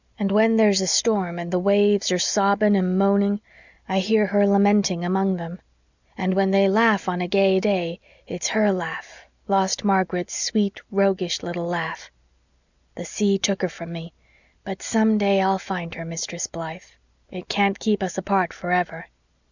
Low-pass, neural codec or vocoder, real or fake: 7.2 kHz; none; real